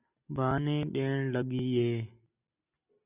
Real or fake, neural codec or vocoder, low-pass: real; none; 3.6 kHz